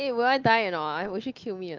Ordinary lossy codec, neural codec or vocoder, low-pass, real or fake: Opus, 32 kbps; none; 7.2 kHz; real